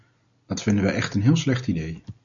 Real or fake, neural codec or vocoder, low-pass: real; none; 7.2 kHz